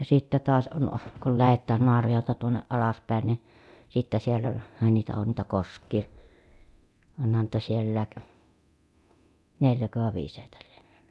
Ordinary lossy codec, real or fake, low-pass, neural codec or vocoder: none; fake; none; codec, 24 kHz, 0.9 kbps, DualCodec